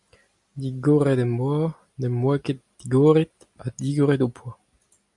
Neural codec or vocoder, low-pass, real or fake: none; 10.8 kHz; real